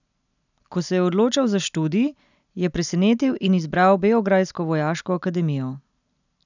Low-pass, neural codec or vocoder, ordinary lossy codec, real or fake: 7.2 kHz; none; none; real